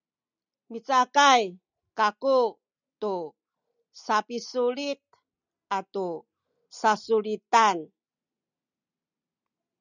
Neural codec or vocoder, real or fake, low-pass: none; real; 7.2 kHz